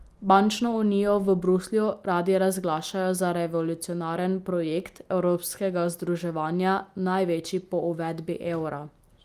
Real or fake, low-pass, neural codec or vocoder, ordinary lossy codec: real; 14.4 kHz; none; Opus, 32 kbps